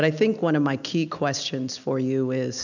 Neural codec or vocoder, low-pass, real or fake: none; 7.2 kHz; real